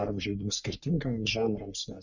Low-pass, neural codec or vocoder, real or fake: 7.2 kHz; codec, 44.1 kHz, 3.4 kbps, Pupu-Codec; fake